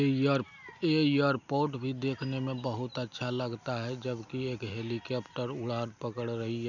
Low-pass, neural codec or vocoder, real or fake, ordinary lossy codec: 7.2 kHz; none; real; none